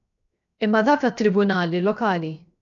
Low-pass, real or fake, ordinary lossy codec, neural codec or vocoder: 7.2 kHz; fake; MP3, 96 kbps; codec, 16 kHz, 0.7 kbps, FocalCodec